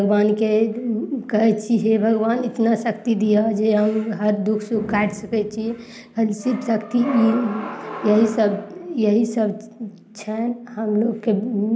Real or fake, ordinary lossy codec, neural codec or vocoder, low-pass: real; none; none; none